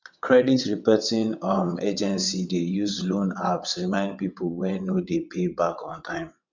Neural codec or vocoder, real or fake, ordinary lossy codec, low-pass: vocoder, 22.05 kHz, 80 mel bands, WaveNeXt; fake; MP3, 64 kbps; 7.2 kHz